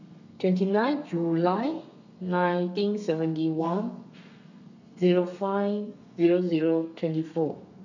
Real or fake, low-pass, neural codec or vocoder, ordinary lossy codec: fake; 7.2 kHz; codec, 32 kHz, 1.9 kbps, SNAC; none